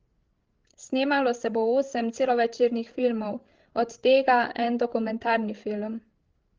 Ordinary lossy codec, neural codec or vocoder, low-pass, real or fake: Opus, 16 kbps; codec, 16 kHz, 16 kbps, FreqCodec, larger model; 7.2 kHz; fake